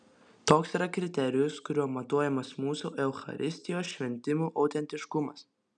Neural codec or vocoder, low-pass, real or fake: none; 9.9 kHz; real